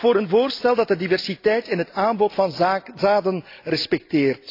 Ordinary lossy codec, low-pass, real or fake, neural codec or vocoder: AAC, 32 kbps; 5.4 kHz; real; none